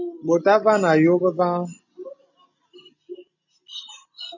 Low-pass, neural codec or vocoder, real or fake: 7.2 kHz; none; real